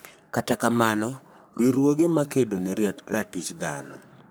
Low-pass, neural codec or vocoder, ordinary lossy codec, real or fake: none; codec, 44.1 kHz, 3.4 kbps, Pupu-Codec; none; fake